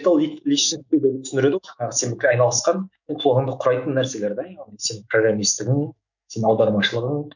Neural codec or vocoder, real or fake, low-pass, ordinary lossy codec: autoencoder, 48 kHz, 128 numbers a frame, DAC-VAE, trained on Japanese speech; fake; 7.2 kHz; none